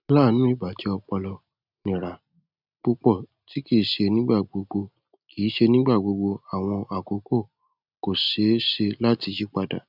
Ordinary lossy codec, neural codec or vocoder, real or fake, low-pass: none; none; real; 5.4 kHz